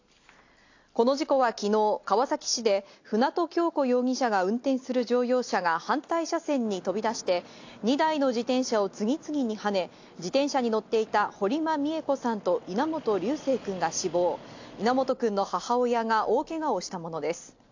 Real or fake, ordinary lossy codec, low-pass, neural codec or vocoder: real; AAC, 48 kbps; 7.2 kHz; none